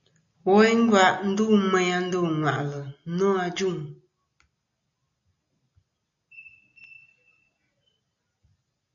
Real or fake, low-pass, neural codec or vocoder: real; 7.2 kHz; none